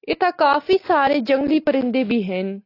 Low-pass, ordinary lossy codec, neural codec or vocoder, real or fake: 5.4 kHz; AAC, 32 kbps; none; real